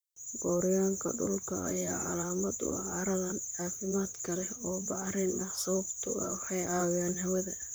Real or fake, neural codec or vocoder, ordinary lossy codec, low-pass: fake; vocoder, 44.1 kHz, 128 mel bands, Pupu-Vocoder; none; none